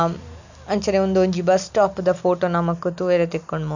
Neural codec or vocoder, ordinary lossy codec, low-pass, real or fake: none; none; 7.2 kHz; real